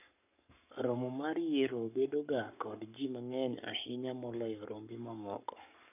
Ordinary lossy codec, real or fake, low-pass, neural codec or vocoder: none; fake; 3.6 kHz; codec, 44.1 kHz, 7.8 kbps, Pupu-Codec